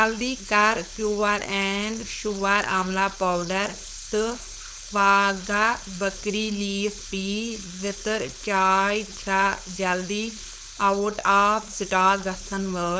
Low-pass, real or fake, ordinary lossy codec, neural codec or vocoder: none; fake; none; codec, 16 kHz, 4.8 kbps, FACodec